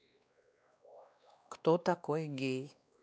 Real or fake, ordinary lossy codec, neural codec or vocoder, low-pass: fake; none; codec, 16 kHz, 2 kbps, X-Codec, WavLM features, trained on Multilingual LibriSpeech; none